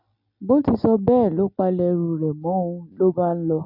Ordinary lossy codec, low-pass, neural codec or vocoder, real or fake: none; 5.4 kHz; none; real